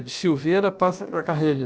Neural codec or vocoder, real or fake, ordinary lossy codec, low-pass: codec, 16 kHz, about 1 kbps, DyCAST, with the encoder's durations; fake; none; none